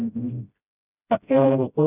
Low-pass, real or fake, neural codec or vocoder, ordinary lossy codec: 3.6 kHz; fake; codec, 16 kHz, 0.5 kbps, FreqCodec, smaller model; none